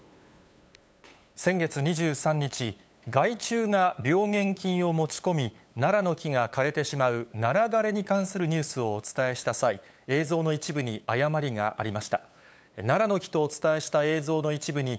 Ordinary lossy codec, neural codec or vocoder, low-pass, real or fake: none; codec, 16 kHz, 8 kbps, FunCodec, trained on LibriTTS, 25 frames a second; none; fake